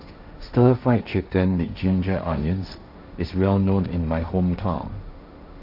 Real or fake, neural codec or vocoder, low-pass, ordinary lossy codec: fake; codec, 16 kHz, 1.1 kbps, Voila-Tokenizer; 5.4 kHz; AAC, 48 kbps